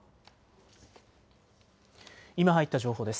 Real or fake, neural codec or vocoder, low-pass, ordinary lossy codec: real; none; none; none